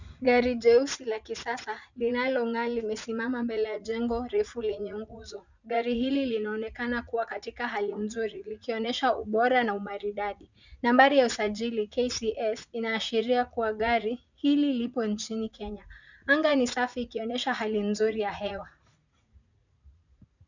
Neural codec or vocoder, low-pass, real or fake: vocoder, 44.1 kHz, 80 mel bands, Vocos; 7.2 kHz; fake